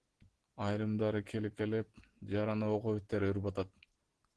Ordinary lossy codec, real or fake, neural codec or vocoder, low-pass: Opus, 16 kbps; real; none; 10.8 kHz